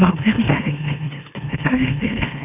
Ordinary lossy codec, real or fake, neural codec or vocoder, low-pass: none; fake; autoencoder, 44.1 kHz, a latent of 192 numbers a frame, MeloTTS; 3.6 kHz